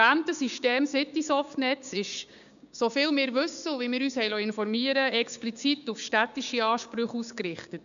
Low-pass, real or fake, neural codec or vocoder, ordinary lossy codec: 7.2 kHz; fake; codec, 16 kHz, 6 kbps, DAC; MP3, 96 kbps